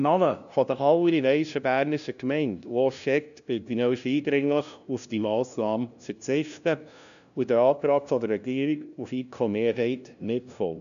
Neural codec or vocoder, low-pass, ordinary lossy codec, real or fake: codec, 16 kHz, 0.5 kbps, FunCodec, trained on LibriTTS, 25 frames a second; 7.2 kHz; none; fake